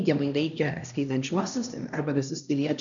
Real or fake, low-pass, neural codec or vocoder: fake; 7.2 kHz; codec, 16 kHz, 1 kbps, X-Codec, HuBERT features, trained on LibriSpeech